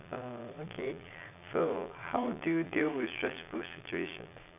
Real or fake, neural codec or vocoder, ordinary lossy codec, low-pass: fake; vocoder, 22.05 kHz, 80 mel bands, Vocos; none; 3.6 kHz